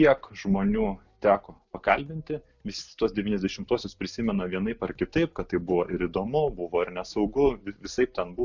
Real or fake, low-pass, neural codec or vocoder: real; 7.2 kHz; none